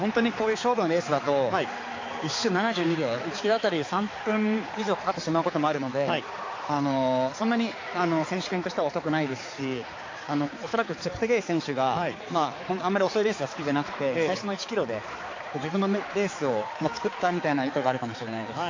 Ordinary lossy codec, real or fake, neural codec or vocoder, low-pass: AAC, 32 kbps; fake; codec, 16 kHz, 4 kbps, X-Codec, HuBERT features, trained on balanced general audio; 7.2 kHz